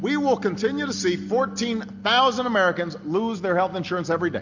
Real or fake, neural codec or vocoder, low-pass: real; none; 7.2 kHz